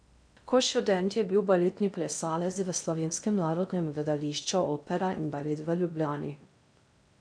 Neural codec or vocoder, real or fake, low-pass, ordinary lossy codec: codec, 16 kHz in and 24 kHz out, 0.6 kbps, FocalCodec, streaming, 2048 codes; fake; 9.9 kHz; none